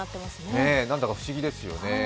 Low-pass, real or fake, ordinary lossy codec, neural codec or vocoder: none; real; none; none